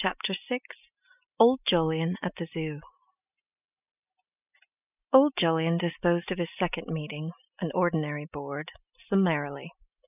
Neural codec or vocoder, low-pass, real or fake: none; 3.6 kHz; real